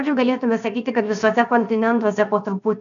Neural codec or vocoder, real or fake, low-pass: codec, 16 kHz, 0.7 kbps, FocalCodec; fake; 7.2 kHz